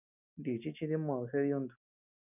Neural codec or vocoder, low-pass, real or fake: none; 3.6 kHz; real